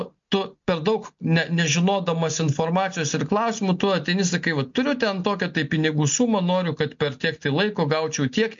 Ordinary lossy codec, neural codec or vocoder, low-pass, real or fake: MP3, 48 kbps; none; 7.2 kHz; real